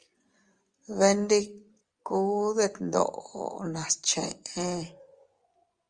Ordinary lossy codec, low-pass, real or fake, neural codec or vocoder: Opus, 32 kbps; 9.9 kHz; fake; vocoder, 24 kHz, 100 mel bands, Vocos